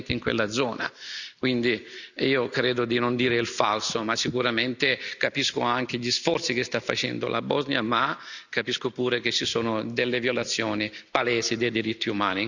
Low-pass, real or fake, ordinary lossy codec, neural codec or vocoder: 7.2 kHz; real; none; none